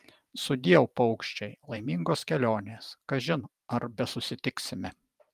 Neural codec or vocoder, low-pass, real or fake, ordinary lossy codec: vocoder, 44.1 kHz, 128 mel bands every 512 samples, BigVGAN v2; 14.4 kHz; fake; Opus, 32 kbps